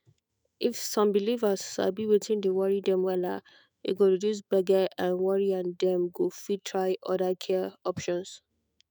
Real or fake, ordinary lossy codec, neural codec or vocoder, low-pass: fake; none; autoencoder, 48 kHz, 128 numbers a frame, DAC-VAE, trained on Japanese speech; none